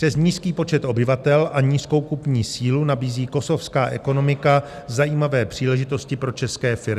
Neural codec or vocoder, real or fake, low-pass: none; real; 14.4 kHz